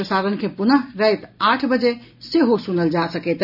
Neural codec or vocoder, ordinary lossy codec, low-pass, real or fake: none; none; 5.4 kHz; real